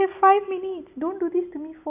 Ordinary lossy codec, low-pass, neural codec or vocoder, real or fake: none; 3.6 kHz; none; real